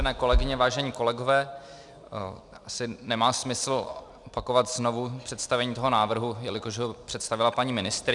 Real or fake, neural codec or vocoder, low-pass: real; none; 10.8 kHz